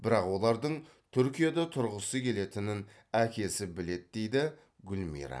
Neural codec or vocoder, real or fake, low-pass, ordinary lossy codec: none; real; none; none